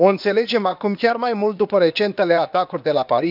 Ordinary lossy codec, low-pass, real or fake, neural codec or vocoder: none; 5.4 kHz; fake; codec, 16 kHz, 0.8 kbps, ZipCodec